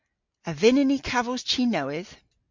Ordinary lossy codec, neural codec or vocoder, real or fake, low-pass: MP3, 48 kbps; none; real; 7.2 kHz